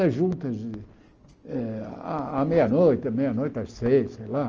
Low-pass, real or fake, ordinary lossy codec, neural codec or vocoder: 7.2 kHz; real; Opus, 24 kbps; none